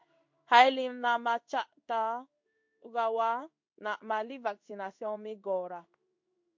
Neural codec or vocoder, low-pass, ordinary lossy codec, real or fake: codec, 16 kHz in and 24 kHz out, 1 kbps, XY-Tokenizer; 7.2 kHz; MP3, 48 kbps; fake